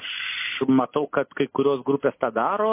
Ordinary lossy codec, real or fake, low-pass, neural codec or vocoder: MP3, 24 kbps; real; 3.6 kHz; none